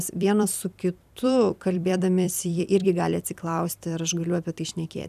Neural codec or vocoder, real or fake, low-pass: vocoder, 44.1 kHz, 128 mel bands every 256 samples, BigVGAN v2; fake; 14.4 kHz